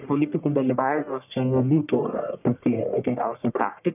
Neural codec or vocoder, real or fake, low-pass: codec, 44.1 kHz, 1.7 kbps, Pupu-Codec; fake; 3.6 kHz